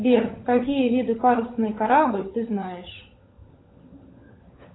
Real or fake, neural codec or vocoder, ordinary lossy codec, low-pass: fake; codec, 16 kHz, 16 kbps, FunCodec, trained on LibriTTS, 50 frames a second; AAC, 16 kbps; 7.2 kHz